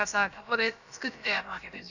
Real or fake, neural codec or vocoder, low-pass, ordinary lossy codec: fake; codec, 16 kHz, about 1 kbps, DyCAST, with the encoder's durations; 7.2 kHz; none